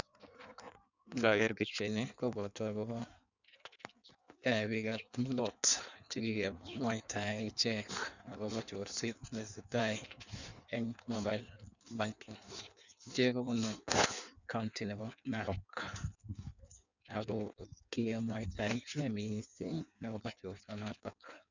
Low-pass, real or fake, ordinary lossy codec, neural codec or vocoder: 7.2 kHz; fake; none; codec, 16 kHz in and 24 kHz out, 1.1 kbps, FireRedTTS-2 codec